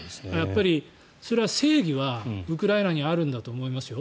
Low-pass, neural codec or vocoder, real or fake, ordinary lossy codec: none; none; real; none